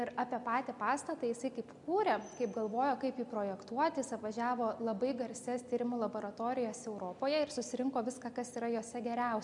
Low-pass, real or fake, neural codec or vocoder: 10.8 kHz; real; none